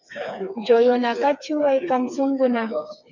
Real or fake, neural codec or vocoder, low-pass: fake; codec, 16 kHz, 4 kbps, FreqCodec, smaller model; 7.2 kHz